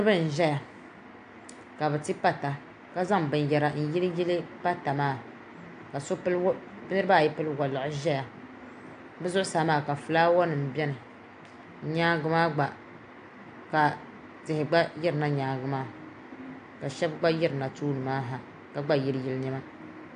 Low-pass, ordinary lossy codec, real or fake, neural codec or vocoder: 9.9 kHz; AAC, 64 kbps; real; none